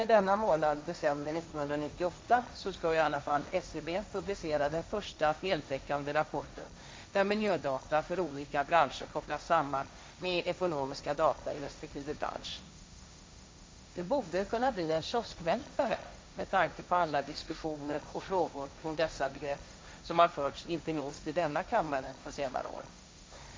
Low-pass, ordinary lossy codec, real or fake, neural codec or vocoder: none; none; fake; codec, 16 kHz, 1.1 kbps, Voila-Tokenizer